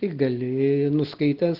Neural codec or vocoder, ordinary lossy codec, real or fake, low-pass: none; Opus, 16 kbps; real; 5.4 kHz